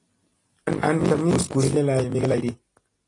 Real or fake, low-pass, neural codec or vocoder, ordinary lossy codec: real; 10.8 kHz; none; AAC, 32 kbps